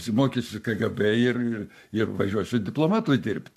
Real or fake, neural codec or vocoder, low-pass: fake; codec, 44.1 kHz, 7.8 kbps, Pupu-Codec; 14.4 kHz